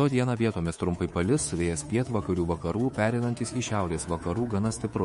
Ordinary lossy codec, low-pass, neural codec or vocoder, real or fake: MP3, 48 kbps; 10.8 kHz; codec, 24 kHz, 3.1 kbps, DualCodec; fake